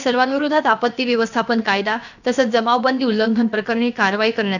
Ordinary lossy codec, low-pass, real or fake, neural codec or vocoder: none; 7.2 kHz; fake; codec, 16 kHz, about 1 kbps, DyCAST, with the encoder's durations